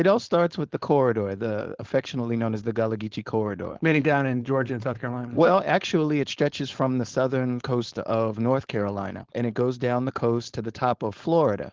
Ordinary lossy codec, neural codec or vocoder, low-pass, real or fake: Opus, 16 kbps; codec, 16 kHz, 4.8 kbps, FACodec; 7.2 kHz; fake